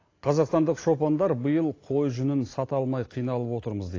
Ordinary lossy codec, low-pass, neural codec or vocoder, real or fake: AAC, 32 kbps; 7.2 kHz; none; real